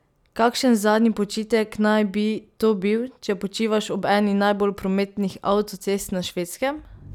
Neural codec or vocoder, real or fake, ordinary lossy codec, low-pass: none; real; none; 19.8 kHz